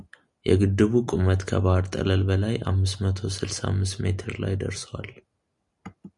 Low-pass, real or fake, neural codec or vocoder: 10.8 kHz; real; none